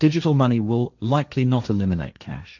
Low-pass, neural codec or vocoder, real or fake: 7.2 kHz; codec, 16 kHz, 1.1 kbps, Voila-Tokenizer; fake